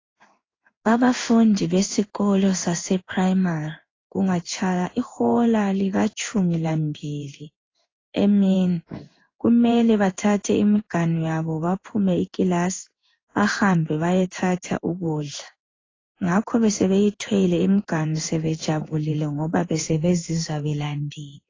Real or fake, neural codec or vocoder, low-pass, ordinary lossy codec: fake; codec, 16 kHz in and 24 kHz out, 1 kbps, XY-Tokenizer; 7.2 kHz; AAC, 32 kbps